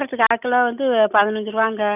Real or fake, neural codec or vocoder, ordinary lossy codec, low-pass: real; none; none; 3.6 kHz